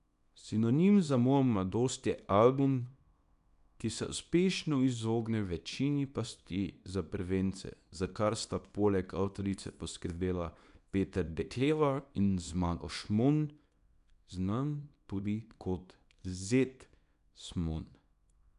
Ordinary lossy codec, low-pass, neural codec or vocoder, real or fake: none; 10.8 kHz; codec, 24 kHz, 0.9 kbps, WavTokenizer, small release; fake